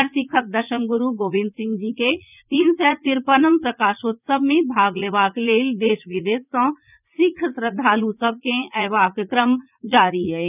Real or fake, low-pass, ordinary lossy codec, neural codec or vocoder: fake; 3.6 kHz; none; vocoder, 44.1 kHz, 80 mel bands, Vocos